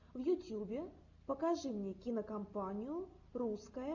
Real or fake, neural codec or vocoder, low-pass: real; none; 7.2 kHz